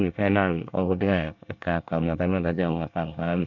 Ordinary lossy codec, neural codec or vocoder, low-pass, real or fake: none; codec, 24 kHz, 1 kbps, SNAC; 7.2 kHz; fake